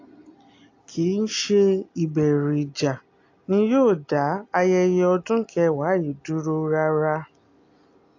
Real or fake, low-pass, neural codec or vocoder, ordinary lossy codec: real; 7.2 kHz; none; AAC, 48 kbps